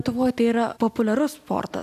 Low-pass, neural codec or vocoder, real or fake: 14.4 kHz; none; real